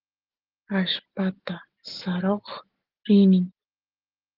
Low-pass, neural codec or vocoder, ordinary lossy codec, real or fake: 5.4 kHz; none; Opus, 16 kbps; real